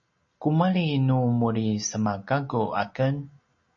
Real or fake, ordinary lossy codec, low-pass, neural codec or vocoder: real; MP3, 32 kbps; 7.2 kHz; none